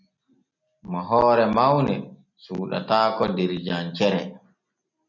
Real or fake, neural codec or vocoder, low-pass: real; none; 7.2 kHz